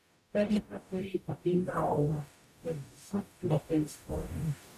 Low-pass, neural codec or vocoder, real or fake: 14.4 kHz; codec, 44.1 kHz, 0.9 kbps, DAC; fake